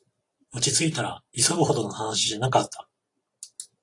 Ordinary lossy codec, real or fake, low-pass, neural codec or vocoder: AAC, 48 kbps; fake; 10.8 kHz; vocoder, 44.1 kHz, 128 mel bands every 256 samples, BigVGAN v2